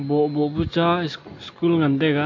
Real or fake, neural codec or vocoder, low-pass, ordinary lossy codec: real; none; 7.2 kHz; AAC, 48 kbps